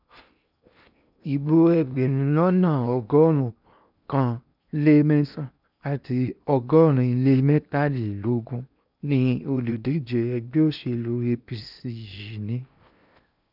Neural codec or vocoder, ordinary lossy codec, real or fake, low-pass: codec, 16 kHz in and 24 kHz out, 0.8 kbps, FocalCodec, streaming, 65536 codes; none; fake; 5.4 kHz